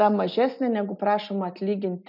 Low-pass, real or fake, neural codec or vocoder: 5.4 kHz; real; none